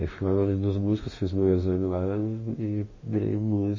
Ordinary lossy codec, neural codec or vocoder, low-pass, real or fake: MP3, 32 kbps; autoencoder, 48 kHz, 32 numbers a frame, DAC-VAE, trained on Japanese speech; 7.2 kHz; fake